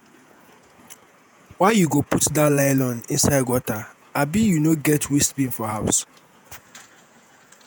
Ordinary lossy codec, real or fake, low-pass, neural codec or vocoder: none; fake; none; vocoder, 48 kHz, 128 mel bands, Vocos